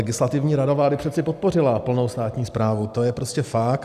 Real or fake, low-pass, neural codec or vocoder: real; 14.4 kHz; none